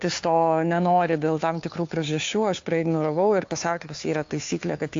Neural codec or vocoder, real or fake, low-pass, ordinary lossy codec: codec, 16 kHz, 2 kbps, FunCodec, trained on Chinese and English, 25 frames a second; fake; 7.2 kHz; AAC, 48 kbps